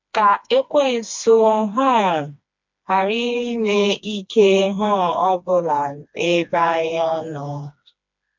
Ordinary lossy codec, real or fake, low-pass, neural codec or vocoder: MP3, 64 kbps; fake; 7.2 kHz; codec, 16 kHz, 2 kbps, FreqCodec, smaller model